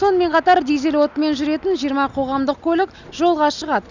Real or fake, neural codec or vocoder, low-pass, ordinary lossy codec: real; none; 7.2 kHz; none